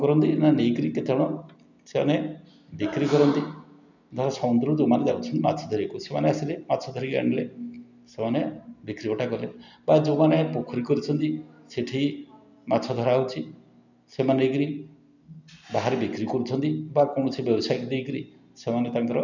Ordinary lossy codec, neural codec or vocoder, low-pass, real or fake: none; none; 7.2 kHz; real